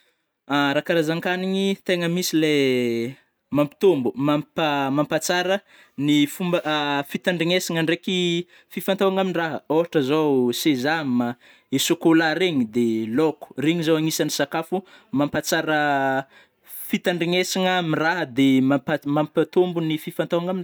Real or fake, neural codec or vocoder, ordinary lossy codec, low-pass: real; none; none; none